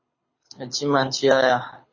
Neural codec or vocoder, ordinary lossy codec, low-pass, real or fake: codec, 24 kHz, 6 kbps, HILCodec; MP3, 32 kbps; 7.2 kHz; fake